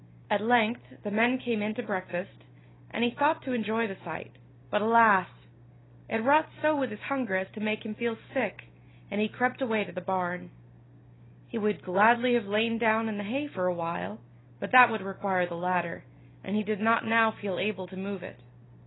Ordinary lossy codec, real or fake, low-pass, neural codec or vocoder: AAC, 16 kbps; real; 7.2 kHz; none